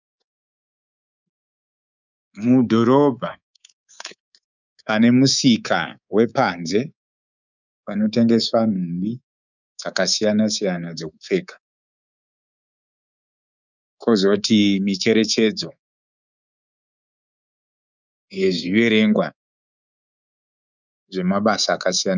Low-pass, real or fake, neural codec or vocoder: 7.2 kHz; fake; codec, 24 kHz, 3.1 kbps, DualCodec